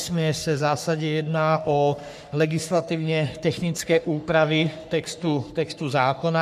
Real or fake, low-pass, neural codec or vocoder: fake; 14.4 kHz; codec, 44.1 kHz, 3.4 kbps, Pupu-Codec